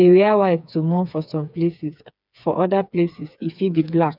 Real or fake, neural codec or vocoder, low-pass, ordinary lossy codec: fake; codec, 16 kHz, 4 kbps, FreqCodec, smaller model; 5.4 kHz; none